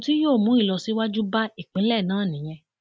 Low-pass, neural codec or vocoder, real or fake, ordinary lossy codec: none; none; real; none